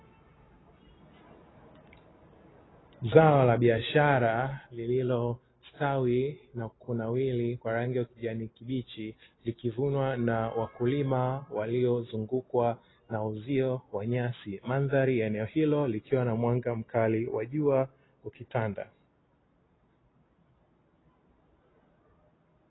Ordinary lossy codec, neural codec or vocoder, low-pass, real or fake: AAC, 16 kbps; none; 7.2 kHz; real